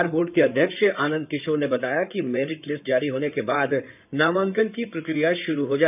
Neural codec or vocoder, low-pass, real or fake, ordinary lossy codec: codec, 16 kHz in and 24 kHz out, 2.2 kbps, FireRedTTS-2 codec; 3.6 kHz; fake; none